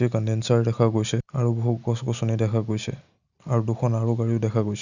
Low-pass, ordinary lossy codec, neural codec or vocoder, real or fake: 7.2 kHz; none; none; real